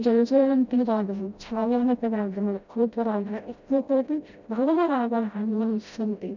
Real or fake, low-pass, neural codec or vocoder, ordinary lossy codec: fake; 7.2 kHz; codec, 16 kHz, 0.5 kbps, FreqCodec, smaller model; none